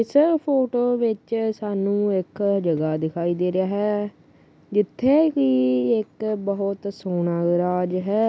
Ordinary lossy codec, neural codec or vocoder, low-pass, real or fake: none; none; none; real